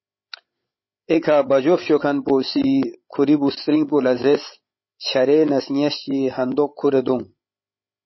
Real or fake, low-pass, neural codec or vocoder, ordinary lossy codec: fake; 7.2 kHz; codec, 16 kHz, 16 kbps, FreqCodec, larger model; MP3, 24 kbps